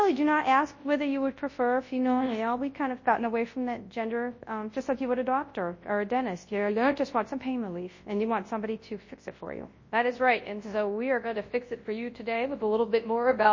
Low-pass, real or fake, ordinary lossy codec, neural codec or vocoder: 7.2 kHz; fake; MP3, 32 kbps; codec, 24 kHz, 0.9 kbps, WavTokenizer, large speech release